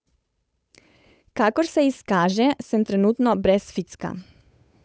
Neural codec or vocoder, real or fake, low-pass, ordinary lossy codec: codec, 16 kHz, 8 kbps, FunCodec, trained on Chinese and English, 25 frames a second; fake; none; none